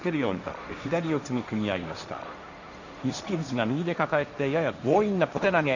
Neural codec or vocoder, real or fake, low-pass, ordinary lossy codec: codec, 16 kHz, 1.1 kbps, Voila-Tokenizer; fake; 7.2 kHz; none